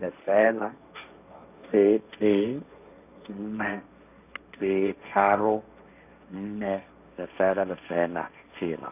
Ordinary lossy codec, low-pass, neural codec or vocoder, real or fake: none; 3.6 kHz; codec, 16 kHz, 1.1 kbps, Voila-Tokenizer; fake